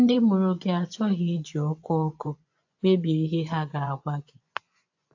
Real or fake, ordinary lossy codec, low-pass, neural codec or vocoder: fake; AAC, 48 kbps; 7.2 kHz; vocoder, 24 kHz, 100 mel bands, Vocos